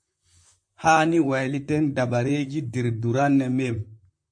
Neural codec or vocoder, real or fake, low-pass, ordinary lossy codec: vocoder, 44.1 kHz, 128 mel bands, Pupu-Vocoder; fake; 9.9 kHz; MP3, 48 kbps